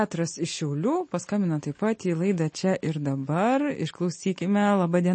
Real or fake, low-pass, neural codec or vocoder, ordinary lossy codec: real; 9.9 kHz; none; MP3, 32 kbps